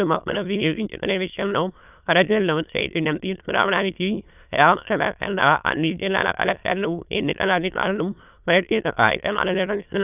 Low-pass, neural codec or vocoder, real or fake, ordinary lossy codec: 3.6 kHz; autoencoder, 22.05 kHz, a latent of 192 numbers a frame, VITS, trained on many speakers; fake; none